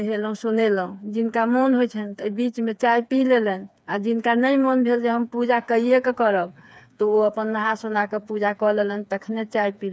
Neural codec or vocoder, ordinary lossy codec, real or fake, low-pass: codec, 16 kHz, 4 kbps, FreqCodec, smaller model; none; fake; none